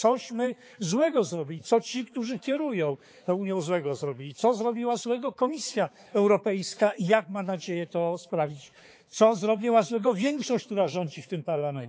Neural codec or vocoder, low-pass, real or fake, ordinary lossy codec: codec, 16 kHz, 4 kbps, X-Codec, HuBERT features, trained on balanced general audio; none; fake; none